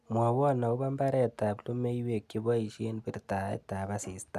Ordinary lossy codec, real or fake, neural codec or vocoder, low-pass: none; real; none; 14.4 kHz